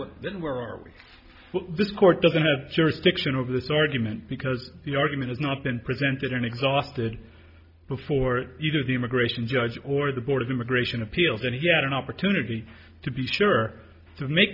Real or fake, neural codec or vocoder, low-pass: real; none; 5.4 kHz